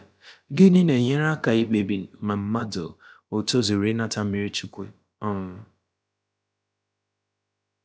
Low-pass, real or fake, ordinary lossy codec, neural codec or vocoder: none; fake; none; codec, 16 kHz, about 1 kbps, DyCAST, with the encoder's durations